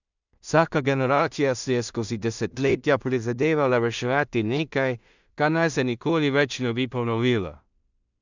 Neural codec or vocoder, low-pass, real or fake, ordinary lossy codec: codec, 16 kHz in and 24 kHz out, 0.4 kbps, LongCat-Audio-Codec, two codebook decoder; 7.2 kHz; fake; none